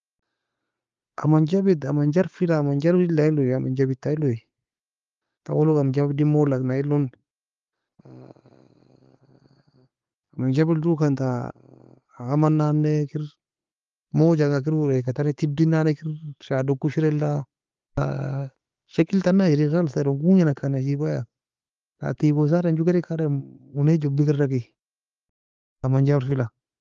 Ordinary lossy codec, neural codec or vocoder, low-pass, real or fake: Opus, 32 kbps; none; 7.2 kHz; real